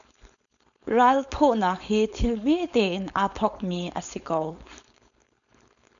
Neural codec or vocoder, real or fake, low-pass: codec, 16 kHz, 4.8 kbps, FACodec; fake; 7.2 kHz